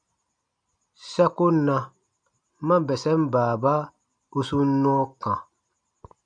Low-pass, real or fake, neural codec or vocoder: 9.9 kHz; real; none